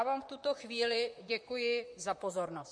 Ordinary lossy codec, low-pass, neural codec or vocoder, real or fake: MP3, 48 kbps; 9.9 kHz; vocoder, 44.1 kHz, 128 mel bands, Pupu-Vocoder; fake